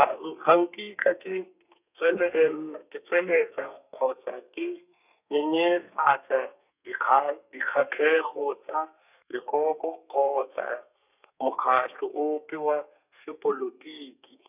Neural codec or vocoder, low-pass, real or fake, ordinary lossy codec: codec, 32 kHz, 1.9 kbps, SNAC; 3.6 kHz; fake; none